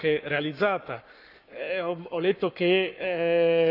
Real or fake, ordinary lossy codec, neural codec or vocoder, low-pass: fake; none; codec, 44.1 kHz, 7.8 kbps, Pupu-Codec; 5.4 kHz